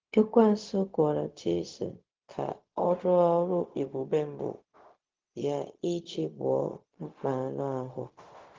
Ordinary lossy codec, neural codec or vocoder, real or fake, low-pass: Opus, 16 kbps; codec, 16 kHz, 0.4 kbps, LongCat-Audio-Codec; fake; 7.2 kHz